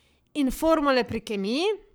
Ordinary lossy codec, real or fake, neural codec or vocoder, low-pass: none; fake; codec, 44.1 kHz, 7.8 kbps, Pupu-Codec; none